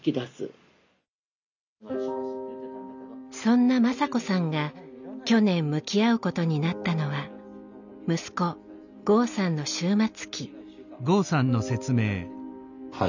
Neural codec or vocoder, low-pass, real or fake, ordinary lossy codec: none; 7.2 kHz; real; none